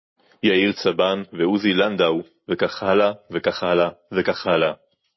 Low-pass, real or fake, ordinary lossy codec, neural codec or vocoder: 7.2 kHz; real; MP3, 24 kbps; none